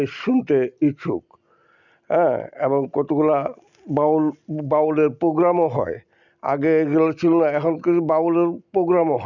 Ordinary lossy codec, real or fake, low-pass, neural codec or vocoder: none; real; 7.2 kHz; none